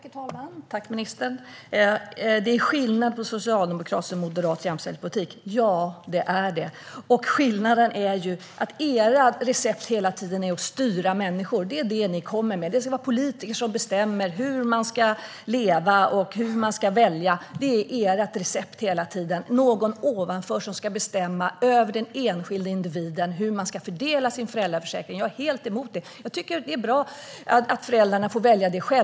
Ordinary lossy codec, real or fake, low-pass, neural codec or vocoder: none; real; none; none